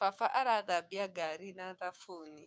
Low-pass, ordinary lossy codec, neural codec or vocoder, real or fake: none; none; codec, 16 kHz, 6 kbps, DAC; fake